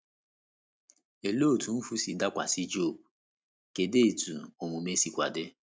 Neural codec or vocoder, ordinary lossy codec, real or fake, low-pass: none; none; real; none